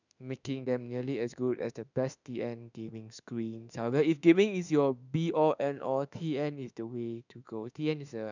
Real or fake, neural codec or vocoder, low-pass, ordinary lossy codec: fake; autoencoder, 48 kHz, 32 numbers a frame, DAC-VAE, trained on Japanese speech; 7.2 kHz; none